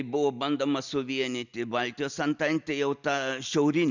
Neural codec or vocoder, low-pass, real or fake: none; 7.2 kHz; real